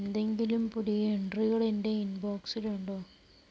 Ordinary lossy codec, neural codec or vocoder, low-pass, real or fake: none; none; none; real